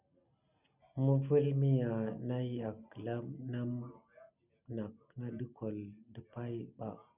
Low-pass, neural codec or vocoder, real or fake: 3.6 kHz; none; real